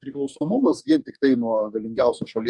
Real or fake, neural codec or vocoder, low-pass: fake; codec, 44.1 kHz, 2.6 kbps, DAC; 10.8 kHz